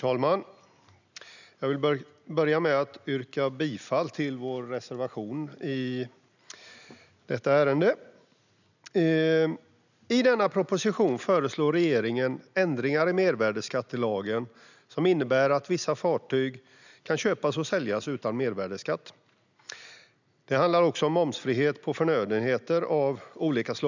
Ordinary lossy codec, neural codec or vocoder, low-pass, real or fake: none; none; 7.2 kHz; real